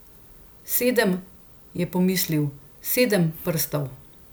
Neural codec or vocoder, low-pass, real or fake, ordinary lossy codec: none; none; real; none